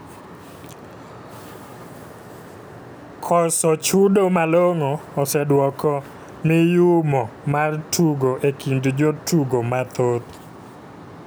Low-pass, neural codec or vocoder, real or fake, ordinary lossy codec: none; none; real; none